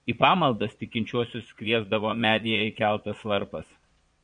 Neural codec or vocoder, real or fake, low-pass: vocoder, 22.05 kHz, 80 mel bands, Vocos; fake; 9.9 kHz